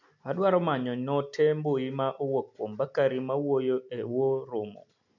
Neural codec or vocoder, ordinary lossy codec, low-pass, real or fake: none; none; 7.2 kHz; real